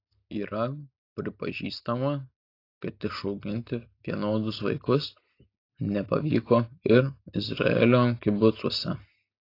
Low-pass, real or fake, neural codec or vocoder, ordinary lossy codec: 5.4 kHz; real; none; AAC, 32 kbps